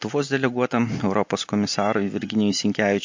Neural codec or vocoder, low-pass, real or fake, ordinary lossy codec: none; 7.2 kHz; real; MP3, 48 kbps